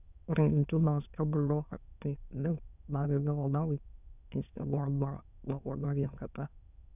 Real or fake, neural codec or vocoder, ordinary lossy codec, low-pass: fake; autoencoder, 22.05 kHz, a latent of 192 numbers a frame, VITS, trained on many speakers; none; 3.6 kHz